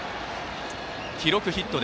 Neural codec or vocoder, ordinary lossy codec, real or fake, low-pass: none; none; real; none